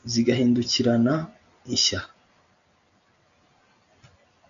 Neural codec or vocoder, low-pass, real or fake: none; 7.2 kHz; real